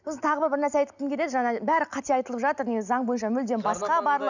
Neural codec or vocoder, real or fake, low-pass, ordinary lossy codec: none; real; 7.2 kHz; none